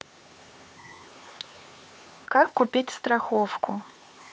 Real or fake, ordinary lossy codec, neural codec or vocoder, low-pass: fake; none; codec, 16 kHz, 4 kbps, X-Codec, HuBERT features, trained on LibriSpeech; none